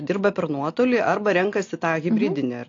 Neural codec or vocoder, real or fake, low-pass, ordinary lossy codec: none; real; 7.2 kHz; AAC, 48 kbps